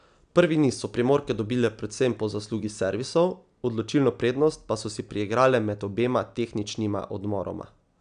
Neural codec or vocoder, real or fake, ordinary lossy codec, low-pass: none; real; none; 9.9 kHz